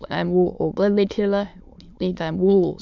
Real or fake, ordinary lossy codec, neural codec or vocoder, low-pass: fake; none; autoencoder, 22.05 kHz, a latent of 192 numbers a frame, VITS, trained on many speakers; 7.2 kHz